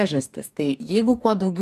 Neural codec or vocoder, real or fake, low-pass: codec, 44.1 kHz, 2.6 kbps, DAC; fake; 14.4 kHz